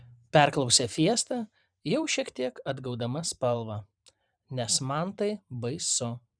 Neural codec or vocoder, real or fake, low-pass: vocoder, 44.1 kHz, 128 mel bands every 512 samples, BigVGAN v2; fake; 9.9 kHz